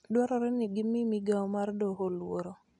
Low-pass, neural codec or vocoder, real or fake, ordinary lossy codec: 10.8 kHz; none; real; none